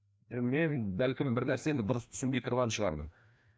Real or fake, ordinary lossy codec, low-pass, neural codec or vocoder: fake; none; none; codec, 16 kHz, 1 kbps, FreqCodec, larger model